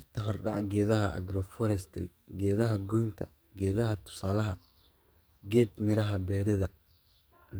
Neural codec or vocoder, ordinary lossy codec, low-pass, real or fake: codec, 44.1 kHz, 2.6 kbps, SNAC; none; none; fake